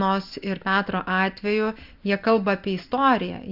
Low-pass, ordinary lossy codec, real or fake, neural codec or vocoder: 5.4 kHz; Opus, 64 kbps; real; none